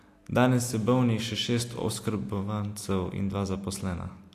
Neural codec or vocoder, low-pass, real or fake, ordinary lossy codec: none; 14.4 kHz; real; AAC, 64 kbps